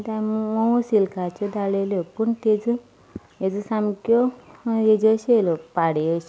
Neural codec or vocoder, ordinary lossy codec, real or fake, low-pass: none; none; real; none